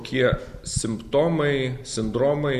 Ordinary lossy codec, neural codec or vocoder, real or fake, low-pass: Opus, 64 kbps; none; real; 14.4 kHz